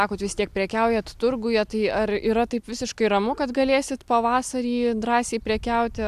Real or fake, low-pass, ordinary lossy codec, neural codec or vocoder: real; 14.4 kHz; AAC, 96 kbps; none